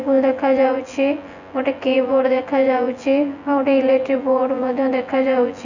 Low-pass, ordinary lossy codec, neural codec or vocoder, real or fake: 7.2 kHz; none; vocoder, 24 kHz, 100 mel bands, Vocos; fake